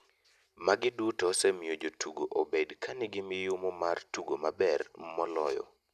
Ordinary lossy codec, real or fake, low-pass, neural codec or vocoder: none; real; 14.4 kHz; none